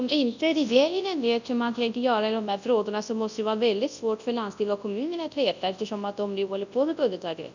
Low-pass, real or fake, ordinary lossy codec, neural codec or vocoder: 7.2 kHz; fake; none; codec, 24 kHz, 0.9 kbps, WavTokenizer, large speech release